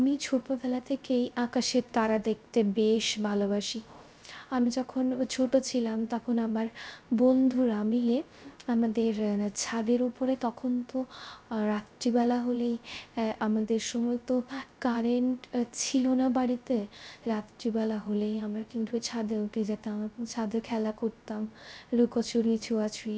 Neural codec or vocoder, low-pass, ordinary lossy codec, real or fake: codec, 16 kHz, 0.3 kbps, FocalCodec; none; none; fake